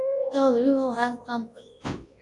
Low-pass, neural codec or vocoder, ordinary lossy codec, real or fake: 10.8 kHz; codec, 24 kHz, 0.9 kbps, WavTokenizer, large speech release; AAC, 48 kbps; fake